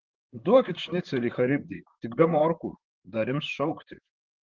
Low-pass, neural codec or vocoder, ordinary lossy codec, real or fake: 7.2 kHz; vocoder, 44.1 kHz, 128 mel bands, Pupu-Vocoder; Opus, 16 kbps; fake